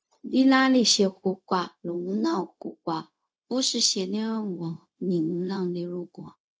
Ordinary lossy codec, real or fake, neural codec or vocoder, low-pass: none; fake; codec, 16 kHz, 0.4 kbps, LongCat-Audio-Codec; none